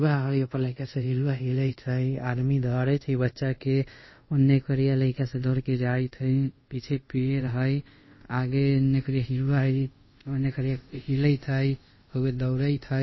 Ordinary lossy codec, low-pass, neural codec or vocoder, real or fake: MP3, 24 kbps; 7.2 kHz; codec, 24 kHz, 0.5 kbps, DualCodec; fake